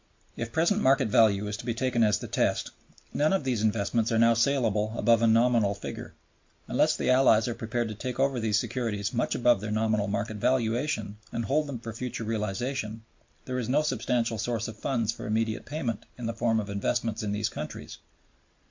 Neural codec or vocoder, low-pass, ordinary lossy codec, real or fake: none; 7.2 kHz; MP3, 48 kbps; real